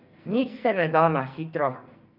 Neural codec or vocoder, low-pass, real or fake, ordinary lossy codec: codec, 44.1 kHz, 2.6 kbps, SNAC; 5.4 kHz; fake; none